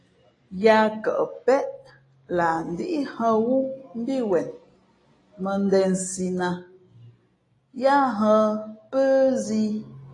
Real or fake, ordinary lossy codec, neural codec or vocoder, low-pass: real; AAC, 32 kbps; none; 10.8 kHz